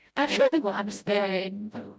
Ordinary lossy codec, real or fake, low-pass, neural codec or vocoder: none; fake; none; codec, 16 kHz, 0.5 kbps, FreqCodec, smaller model